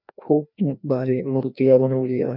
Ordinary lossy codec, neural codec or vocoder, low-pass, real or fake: none; codec, 16 kHz, 1 kbps, FreqCodec, larger model; 5.4 kHz; fake